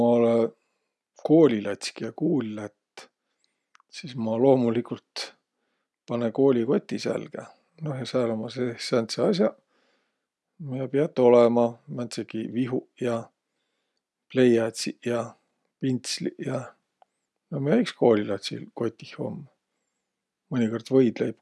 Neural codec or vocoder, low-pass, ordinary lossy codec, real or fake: none; none; none; real